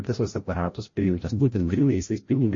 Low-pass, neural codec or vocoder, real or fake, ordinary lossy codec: 7.2 kHz; codec, 16 kHz, 0.5 kbps, FreqCodec, larger model; fake; MP3, 32 kbps